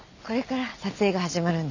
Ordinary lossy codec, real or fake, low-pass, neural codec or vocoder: none; real; 7.2 kHz; none